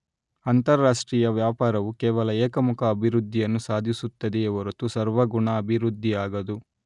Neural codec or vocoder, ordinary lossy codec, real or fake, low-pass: none; none; real; 10.8 kHz